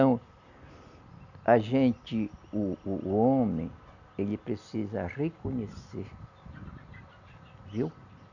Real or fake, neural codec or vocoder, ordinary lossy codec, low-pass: real; none; none; 7.2 kHz